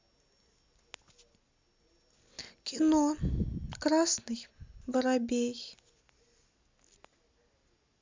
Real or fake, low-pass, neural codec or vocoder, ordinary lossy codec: real; 7.2 kHz; none; MP3, 64 kbps